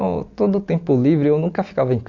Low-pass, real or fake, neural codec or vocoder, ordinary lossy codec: 7.2 kHz; real; none; Opus, 64 kbps